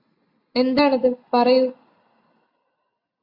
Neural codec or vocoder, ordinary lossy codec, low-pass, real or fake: none; AAC, 32 kbps; 5.4 kHz; real